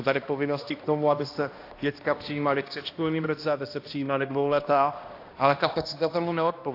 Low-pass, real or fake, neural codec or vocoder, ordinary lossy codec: 5.4 kHz; fake; codec, 16 kHz, 1 kbps, X-Codec, HuBERT features, trained on balanced general audio; AAC, 32 kbps